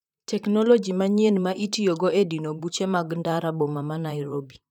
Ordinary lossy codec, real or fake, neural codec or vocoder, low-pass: none; fake; vocoder, 44.1 kHz, 128 mel bands, Pupu-Vocoder; 19.8 kHz